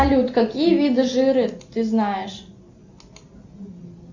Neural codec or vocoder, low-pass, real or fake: none; 7.2 kHz; real